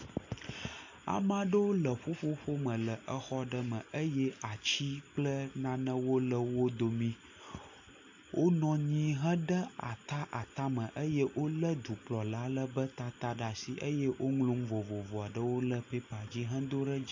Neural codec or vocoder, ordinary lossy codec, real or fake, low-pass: none; AAC, 48 kbps; real; 7.2 kHz